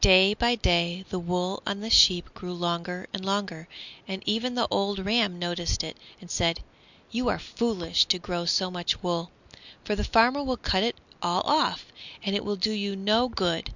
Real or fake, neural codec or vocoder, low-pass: real; none; 7.2 kHz